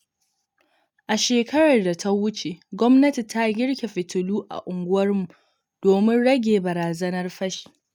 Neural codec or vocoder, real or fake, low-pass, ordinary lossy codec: none; real; 19.8 kHz; none